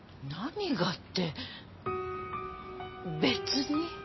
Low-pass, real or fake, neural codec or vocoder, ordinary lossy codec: 7.2 kHz; real; none; MP3, 24 kbps